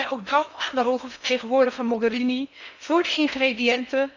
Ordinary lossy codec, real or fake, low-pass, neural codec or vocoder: none; fake; 7.2 kHz; codec, 16 kHz in and 24 kHz out, 0.8 kbps, FocalCodec, streaming, 65536 codes